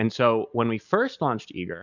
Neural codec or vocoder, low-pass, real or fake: none; 7.2 kHz; real